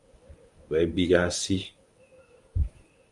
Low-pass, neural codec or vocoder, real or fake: 10.8 kHz; codec, 24 kHz, 0.9 kbps, WavTokenizer, medium speech release version 1; fake